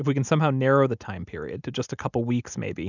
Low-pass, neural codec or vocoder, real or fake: 7.2 kHz; none; real